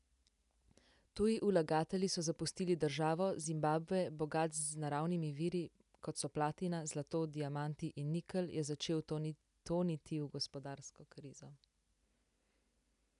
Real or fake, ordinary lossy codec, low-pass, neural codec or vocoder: real; none; 10.8 kHz; none